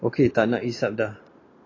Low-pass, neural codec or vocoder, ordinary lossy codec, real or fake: 7.2 kHz; none; AAC, 32 kbps; real